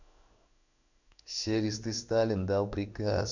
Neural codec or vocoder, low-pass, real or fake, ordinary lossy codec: codec, 16 kHz in and 24 kHz out, 1 kbps, XY-Tokenizer; 7.2 kHz; fake; none